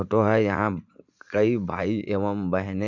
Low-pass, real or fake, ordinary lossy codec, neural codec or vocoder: 7.2 kHz; fake; none; vocoder, 44.1 kHz, 80 mel bands, Vocos